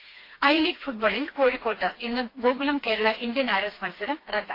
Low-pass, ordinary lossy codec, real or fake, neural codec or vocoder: 5.4 kHz; AAC, 32 kbps; fake; codec, 16 kHz, 2 kbps, FreqCodec, smaller model